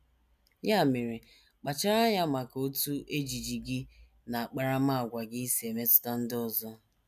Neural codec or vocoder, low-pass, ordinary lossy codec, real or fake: none; 14.4 kHz; none; real